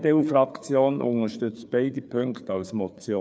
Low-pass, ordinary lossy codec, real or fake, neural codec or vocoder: none; none; fake; codec, 16 kHz, 4 kbps, FreqCodec, larger model